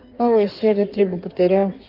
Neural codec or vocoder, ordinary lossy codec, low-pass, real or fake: codec, 16 kHz in and 24 kHz out, 1.1 kbps, FireRedTTS-2 codec; Opus, 32 kbps; 5.4 kHz; fake